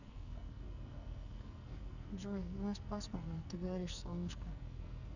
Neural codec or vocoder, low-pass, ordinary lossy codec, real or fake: codec, 44.1 kHz, 2.6 kbps, SNAC; 7.2 kHz; none; fake